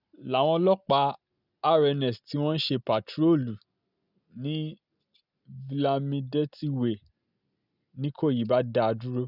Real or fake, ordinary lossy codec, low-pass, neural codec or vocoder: real; none; 5.4 kHz; none